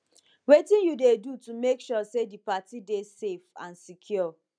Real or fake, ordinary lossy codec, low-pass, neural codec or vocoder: real; none; 9.9 kHz; none